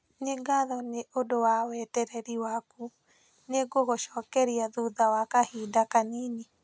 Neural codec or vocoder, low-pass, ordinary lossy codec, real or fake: none; none; none; real